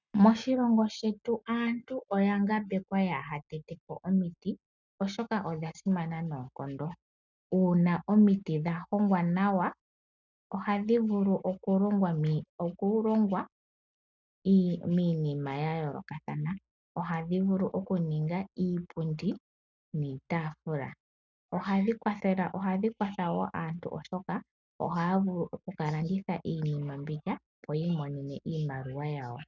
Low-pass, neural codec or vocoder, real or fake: 7.2 kHz; none; real